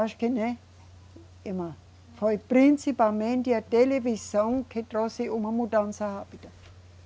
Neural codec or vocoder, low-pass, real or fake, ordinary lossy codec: none; none; real; none